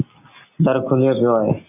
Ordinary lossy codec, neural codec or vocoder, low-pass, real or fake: AAC, 24 kbps; none; 3.6 kHz; real